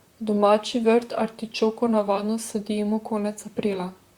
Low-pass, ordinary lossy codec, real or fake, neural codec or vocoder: 19.8 kHz; Opus, 64 kbps; fake; vocoder, 44.1 kHz, 128 mel bands, Pupu-Vocoder